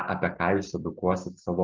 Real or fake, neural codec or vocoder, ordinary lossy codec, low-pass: real; none; Opus, 32 kbps; 7.2 kHz